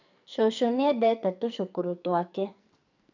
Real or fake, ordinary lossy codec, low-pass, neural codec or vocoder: fake; none; 7.2 kHz; codec, 44.1 kHz, 2.6 kbps, SNAC